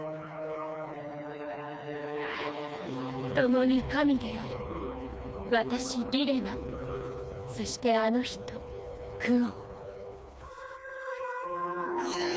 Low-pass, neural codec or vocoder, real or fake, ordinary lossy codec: none; codec, 16 kHz, 2 kbps, FreqCodec, smaller model; fake; none